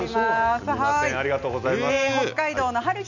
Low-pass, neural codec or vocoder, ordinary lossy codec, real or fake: 7.2 kHz; none; none; real